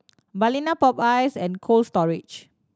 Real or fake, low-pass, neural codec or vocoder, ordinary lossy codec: real; none; none; none